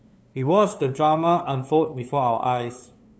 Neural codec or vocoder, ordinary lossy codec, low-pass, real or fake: codec, 16 kHz, 2 kbps, FunCodec, trained on LibriTTS, 25 frames a second; none; none; fake